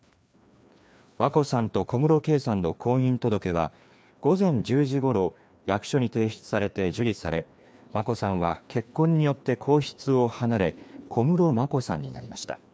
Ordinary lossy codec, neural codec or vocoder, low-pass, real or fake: none; codec, 16 kHz, 2 kbps, FreqCodec, larger model; none; fake